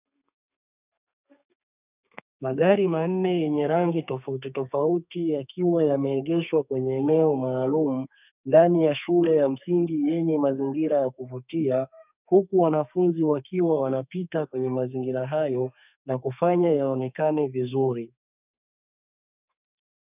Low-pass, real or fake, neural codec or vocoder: 3.6 kHz; fake; codec, 32 kHz, 1.9 kbps, SNAC